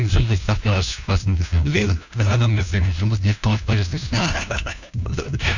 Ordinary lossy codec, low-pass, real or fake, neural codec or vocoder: none; 7.2 kHz; fake; codec, 16 kHz, 1 kbps, FunCodec, trained on LibriTTS, 50 frames a second